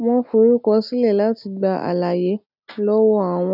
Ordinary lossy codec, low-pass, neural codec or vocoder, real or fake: none; 5.4 kHz; none; real